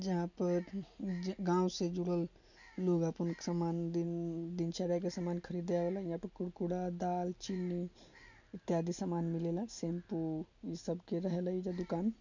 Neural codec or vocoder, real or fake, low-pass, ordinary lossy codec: none; real; 7.2 kHz; none